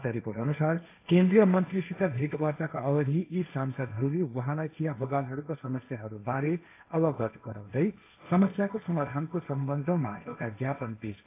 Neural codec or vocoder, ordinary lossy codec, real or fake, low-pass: codec, 16 kHz, 1.1 kbps, Voila-Tokenizer; AAC, 24 kbps; fake; 3.6 kHz